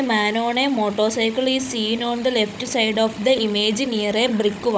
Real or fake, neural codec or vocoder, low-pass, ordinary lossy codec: fake; codec, 16 kHz, 16 kbps, FunCodec, trained on Chinese and English, 50 frames a second; none; none